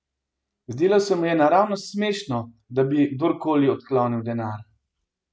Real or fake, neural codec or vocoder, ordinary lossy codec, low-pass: real; none; none; none